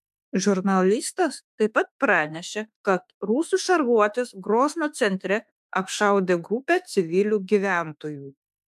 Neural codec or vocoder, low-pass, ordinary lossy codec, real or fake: autoencoder, 48 kHz, 32 numbers a frame, DAC-VAE, trained on Japanese speech; 14.4 kHz; AAC, 96 kbps; fake